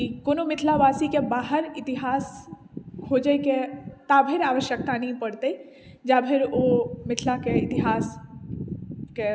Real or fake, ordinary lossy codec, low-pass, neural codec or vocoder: real; none; none; none